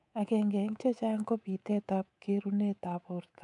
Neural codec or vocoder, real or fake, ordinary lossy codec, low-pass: codec, 24 kHz, 3.1 kbps, DualCodec; fake; MP3, 96 kbps; 10.8 kHz